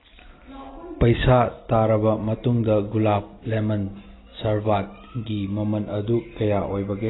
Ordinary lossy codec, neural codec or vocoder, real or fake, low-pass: AAC, 16 kbps; none; real; 7.2 kHz